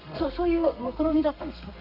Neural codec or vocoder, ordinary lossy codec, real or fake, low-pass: codec, 32 kHz, 1.9 kbps, SNAC; none; fake; 5.4 kHz